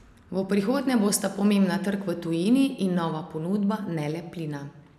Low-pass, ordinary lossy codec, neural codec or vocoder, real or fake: 14.4 kHz; none; none; real